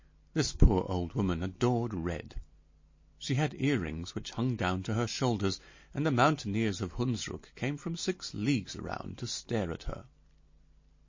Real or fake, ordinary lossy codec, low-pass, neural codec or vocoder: real; MP3, 32 kbps; 7.2 kHz; none